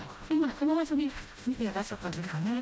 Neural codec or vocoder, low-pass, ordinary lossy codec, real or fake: codec, 16 kHz, 0.5 kbps, FreqCodec, smaller model; none; none; fake